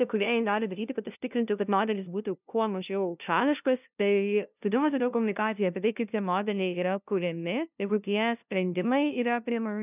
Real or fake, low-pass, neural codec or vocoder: fake; 3.6 kHz; codec, 16 kHz, 0.5 kbps, FunCodec, trained on LibriTTS, 25 frames a second